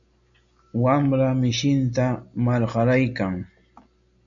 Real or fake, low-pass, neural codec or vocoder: real; 7.2 kHz; none